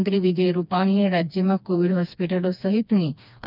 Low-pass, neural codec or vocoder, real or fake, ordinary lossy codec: 5.4 kHz; codec, 16 kHz, 2 kbps, FreqCodec, smaller model; fake; none